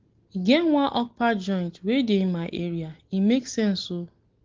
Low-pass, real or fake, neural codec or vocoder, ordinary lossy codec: 7.2 kHz; real; none; Opus, 16 kbps